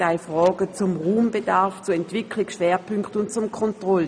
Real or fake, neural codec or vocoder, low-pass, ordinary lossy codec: real; none; 9.9 kHz; none